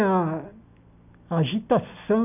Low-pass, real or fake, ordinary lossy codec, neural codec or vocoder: 3.6 kHz; real; none; none